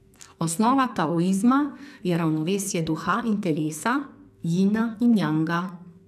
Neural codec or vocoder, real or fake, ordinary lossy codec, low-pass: codec, 44.1 kHz, 2.6 kbps, SNAC; fake; none; 14.4 kHz